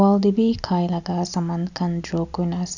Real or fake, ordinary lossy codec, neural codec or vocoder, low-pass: real; none; none; 7.2 kHz